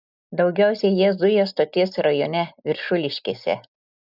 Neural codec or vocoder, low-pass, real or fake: none; 5.4 kHz; real